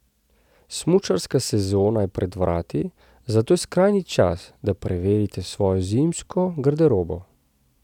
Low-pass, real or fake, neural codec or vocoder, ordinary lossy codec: 19.8 kHz; real; none; none